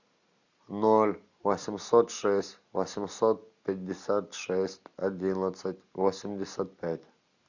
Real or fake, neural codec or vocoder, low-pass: real; none; 7.2 kHz